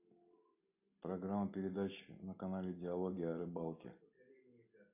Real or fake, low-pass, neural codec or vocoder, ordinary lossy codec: real; 3.6 kHz; none; AAC, 32 kbps